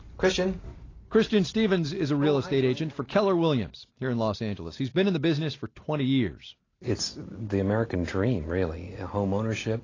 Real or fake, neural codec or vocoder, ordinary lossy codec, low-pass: real; none; AAC, 32 kbps; 7.2 kHz